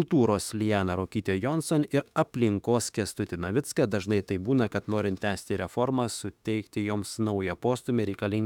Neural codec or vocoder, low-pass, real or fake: autoencoder, 48 kHz, 32 numbers a frame, DAC-VAE, trained on Japanese speech; 19.8 kHz; fake